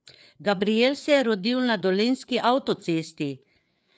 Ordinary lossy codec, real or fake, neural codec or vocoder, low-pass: none; fake; codec, 16 kHz, 4 kbps, FreqCodec, larger model; none